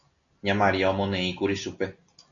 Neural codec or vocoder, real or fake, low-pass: none; real; 7.2 kHz